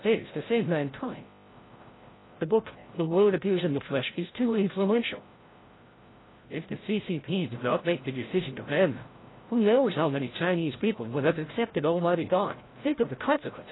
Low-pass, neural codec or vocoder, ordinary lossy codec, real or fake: 7.2 kHz; codec, 16 kHz, 0.5 kbps, FreqCodec, larger model; AAC, 16 kbps; fake